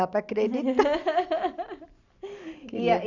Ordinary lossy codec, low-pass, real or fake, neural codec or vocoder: none; 7.2 kHz; real; none